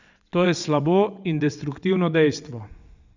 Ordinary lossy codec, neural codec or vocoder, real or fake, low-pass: none; vocoder, 22.05 kHz, 80 mel bands, WaveNeXt; fake; 7.2 kHz